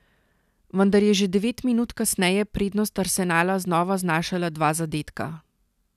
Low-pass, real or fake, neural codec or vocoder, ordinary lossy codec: 14.4 kHz; real; none; none